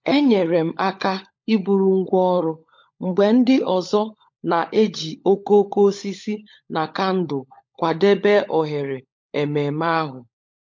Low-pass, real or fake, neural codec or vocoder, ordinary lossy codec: 7.2 kHz; fake; codec, 16 kHz, 16 kbps, FunCodec, trained on LibriTTS, 50 frames a second; MP3, 48 kbps